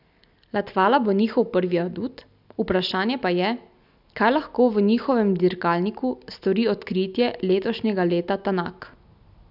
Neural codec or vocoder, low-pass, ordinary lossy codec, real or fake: none; 5.4 kHz; none; real